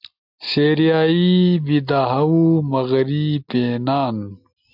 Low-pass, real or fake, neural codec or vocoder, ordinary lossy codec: 5.4 kHz; real; none; AAC, 48 kbps